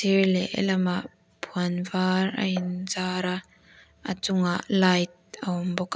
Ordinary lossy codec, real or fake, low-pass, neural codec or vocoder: none; real; none; none